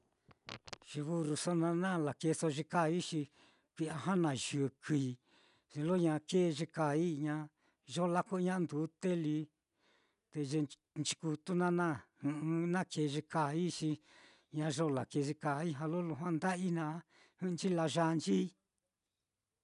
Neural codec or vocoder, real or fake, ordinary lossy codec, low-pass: none; real; none; 10.8 kHz